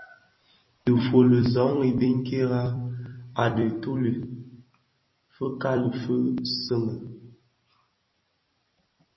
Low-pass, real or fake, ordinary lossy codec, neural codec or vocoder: 7.2 kHz; fake; MP3, 24 kbps; vocoder, 44.1 kHz, 128 mel bands every 256 samples, BigVGAN v2